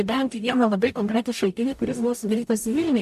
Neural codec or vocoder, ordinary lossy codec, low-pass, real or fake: codec, 44.1 kHz, 0.9 kbps, DAC; MP3, 64 kbps; 14.4 kHz; fake